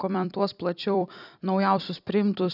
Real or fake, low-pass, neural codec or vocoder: fake; 5.4 kHz; vocoder, 44.1 kHz, 128 mel bands every 256 samples, BigVGAN v2